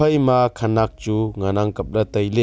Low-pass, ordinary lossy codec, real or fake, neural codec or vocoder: none; none; real; none